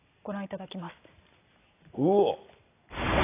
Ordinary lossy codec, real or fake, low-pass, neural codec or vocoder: AAC, 16 kbps; real; 3.6 kHz; none